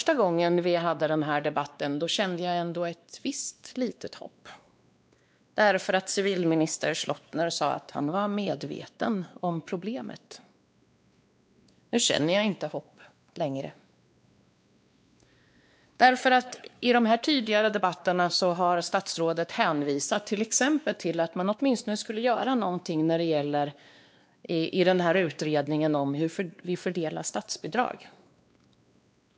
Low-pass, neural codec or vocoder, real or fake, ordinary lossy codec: none; codec, 16 kHz, 2 kbps, X-Codec, WavLM features, trained on Multilingual LibriSpeech; fake; none